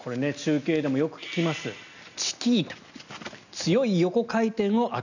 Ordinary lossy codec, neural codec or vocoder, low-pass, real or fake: none; none; 7.2 kHz; real